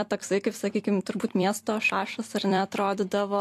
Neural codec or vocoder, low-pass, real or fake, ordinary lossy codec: vocoder, 44.1 kHz, 128 mel bands every 256 samples, BigVGAN v2; 14.4 kHz; fake; AAC, 64 kbps